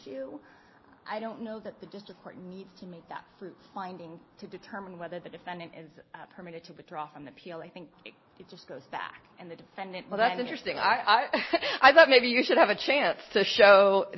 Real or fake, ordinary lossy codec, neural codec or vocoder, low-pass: real; MP3, 24 kbps; none; 7.2 kHz